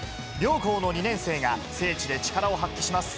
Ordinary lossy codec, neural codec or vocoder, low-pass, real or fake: none; none; none; real